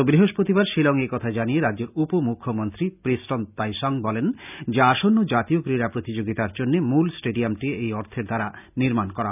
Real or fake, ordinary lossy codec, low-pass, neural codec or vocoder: real; none; 3.6 kHz; none